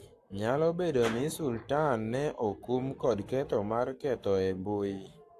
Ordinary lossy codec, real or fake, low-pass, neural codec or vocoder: AAC, 64 kbps; fake; 14.4 kHz; codec, 44.1 kHz, 7.8 kbps, Pupu-Codec